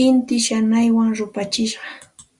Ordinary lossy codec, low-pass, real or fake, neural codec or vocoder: Opus, 64 kbps; 10.8 kHz; real; none